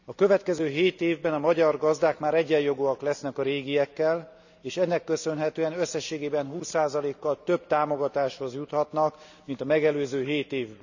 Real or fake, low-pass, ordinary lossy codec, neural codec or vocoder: real; 7.2 kHz; none; none